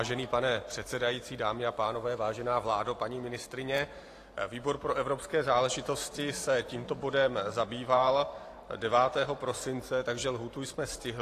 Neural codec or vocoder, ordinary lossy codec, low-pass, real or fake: vocoder, 44.1 kHz, 128 mel bands every 512 samples, BigVGAN v2; AAC, 48 kbps; 14.4 kHz; fake